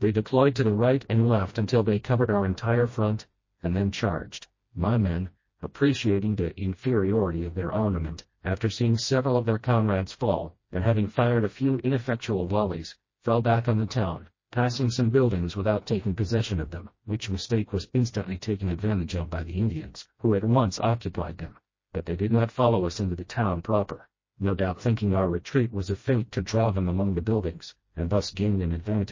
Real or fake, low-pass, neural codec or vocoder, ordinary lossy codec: fake; 7.2 kHz; codec, 16 kHz, 1 kbps, FreqCodec, smaller model; MP3, 32 kbps